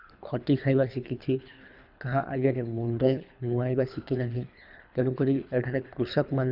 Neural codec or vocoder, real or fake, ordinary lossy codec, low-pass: codec, 24 kHz, 3 kbps, HILCodec; fake; none; 5.4 kHz